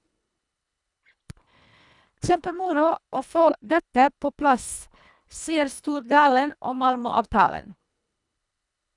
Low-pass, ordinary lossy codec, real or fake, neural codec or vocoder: 10.8 kHz; none; fake; codec, 24 kHz, 1.5 kbps, HILCodec